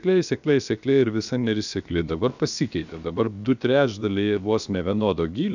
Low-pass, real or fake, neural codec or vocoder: 7.2 kHz; fake; codec, 16 kHz, about 1 kbps, DyCAST, with the encoder's durations